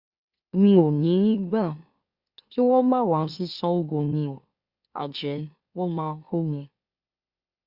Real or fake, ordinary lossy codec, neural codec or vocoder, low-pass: fake; Opus, 64 kbps; autoencoder, 44.1 kHz, a latent of 192 numbers a frame, MeloTTS; 5.4 kHz